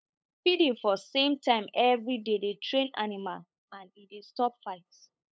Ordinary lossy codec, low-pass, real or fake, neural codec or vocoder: none; none; fake; codec, 16 kHz, 8 kbps, FunCodec, trained on LibriTTS, 25 frames a second